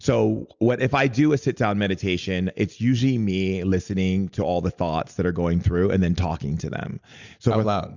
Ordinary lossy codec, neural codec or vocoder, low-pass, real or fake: Opus, 64 kbps; none; 7.2 kHz; real